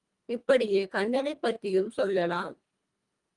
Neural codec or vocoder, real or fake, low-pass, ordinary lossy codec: codec, 24 kHz, 1.5 kbps, HILCodec; fake; 10.8 kHz; Opus, 32 kbps